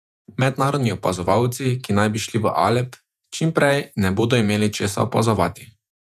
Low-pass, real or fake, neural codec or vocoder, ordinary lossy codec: 14.4 kHz; fake; vocoder, 48 kHz, 128 mel bands, Vocos; none